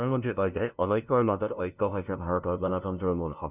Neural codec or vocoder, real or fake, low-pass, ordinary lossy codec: codec, 16 kHz, 0.5 kbps, FunCodec, trained on LibriTTS, 25 frames a second; fake; 3.6 kHz; none